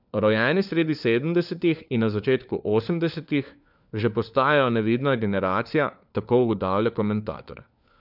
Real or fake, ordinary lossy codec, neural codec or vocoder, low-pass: fake; none; codec, 16 kHz, 4 kbps, FunCodec, trained on LibriTTS, 50 frames a second; 5.4 kHz